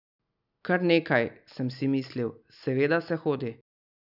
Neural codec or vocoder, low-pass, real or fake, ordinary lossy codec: none; 5.4 kHz; real; none